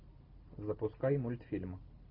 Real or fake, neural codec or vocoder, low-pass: real; none; 5.4 kHz